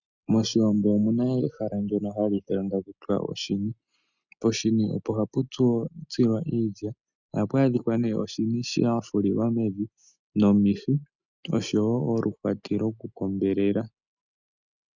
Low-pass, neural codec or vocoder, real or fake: 7.2 kHz; none; real